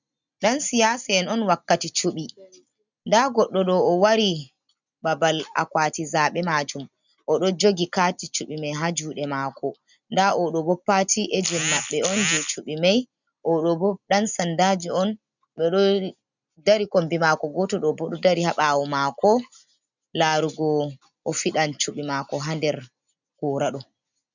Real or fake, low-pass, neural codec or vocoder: real; 7.2 kHz; none